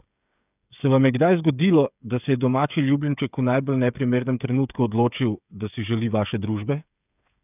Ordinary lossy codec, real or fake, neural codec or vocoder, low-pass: none; fake; codec, 16 kHz, 8 kbps, FreqCodec, smaller model; 3.6 kHz